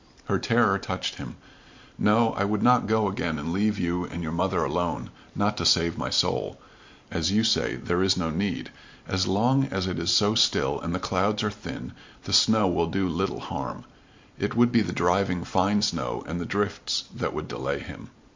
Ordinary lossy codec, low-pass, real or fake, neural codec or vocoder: MP3, 48 kbps; 7.2 kHz; fake; vocoder, 44.1 kHz, 128 mel bands every 512 samples, BigVGAN v2